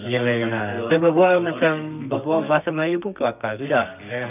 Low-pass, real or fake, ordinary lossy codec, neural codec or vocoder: 3.6 kHz; fake; none; codec, 32 kHz, 1.9 kbps, SNAC